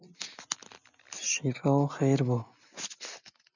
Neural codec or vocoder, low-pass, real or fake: none; 7.2 kHz; real